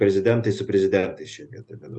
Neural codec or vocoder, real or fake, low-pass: none; real; 10.8 kHz